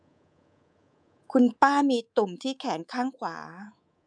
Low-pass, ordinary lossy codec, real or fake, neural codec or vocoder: 9.9 kHz; none; fake; codec, 24 kHz, 3.1 kbps, DualCodec